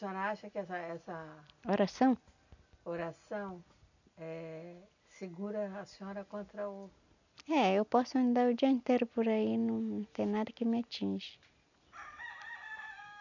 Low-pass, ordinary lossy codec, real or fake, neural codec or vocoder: 7.2 kHz; none; real; none